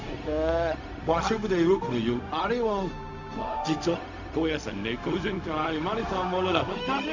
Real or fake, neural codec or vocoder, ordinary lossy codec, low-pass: fake; codec, 16 kHz, 0.4 kbps, LongCat-Audio-Codec; none; 7.2 kHz